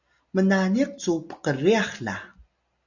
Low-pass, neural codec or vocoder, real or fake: 7.2 kHz; none; real